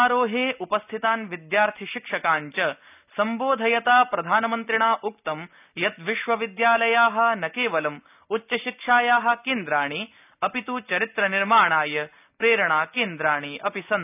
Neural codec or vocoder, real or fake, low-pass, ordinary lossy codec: none; real; 3.6 kHz; none